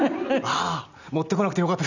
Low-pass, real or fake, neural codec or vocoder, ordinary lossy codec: 7.2 kHz; real; none; none